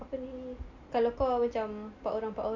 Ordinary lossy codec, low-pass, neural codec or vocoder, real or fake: none; 7.2 kHz; none; real